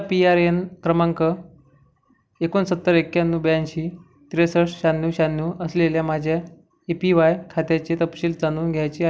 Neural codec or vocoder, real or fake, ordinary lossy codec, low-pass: none; real; none; none